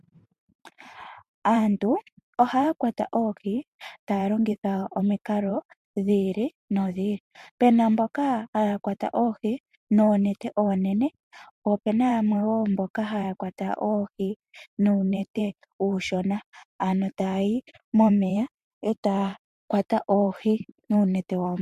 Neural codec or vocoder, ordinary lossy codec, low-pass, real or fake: vocoder, 44.1 kHz, 128 mel bands every 512 samples, BigVGAN v2; MP3, 64 kbps; 14.4 kHz; fake